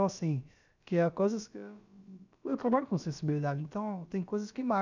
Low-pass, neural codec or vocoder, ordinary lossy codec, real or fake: 7.2 kHz; codec, 16 kHz, about 1 kbps, DyCAST, with the encoder's durations; none; fake